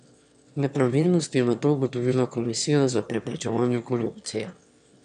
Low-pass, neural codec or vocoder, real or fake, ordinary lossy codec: 9.9 kHz; autoencoder, 22.05 kHz, a latent of 192 numbers a frame, VITS, trained on one speaker; fake; none